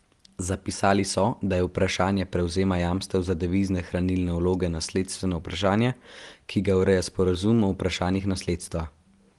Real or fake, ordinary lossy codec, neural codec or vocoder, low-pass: real; Opus, 24 kbps; none; 10.8 kHz